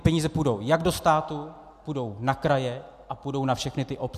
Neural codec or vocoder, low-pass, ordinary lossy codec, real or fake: none; 14.4 kHz; MP3, 96 kbps; real